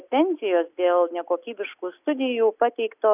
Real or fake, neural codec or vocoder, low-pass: real; none; 3.6 kHz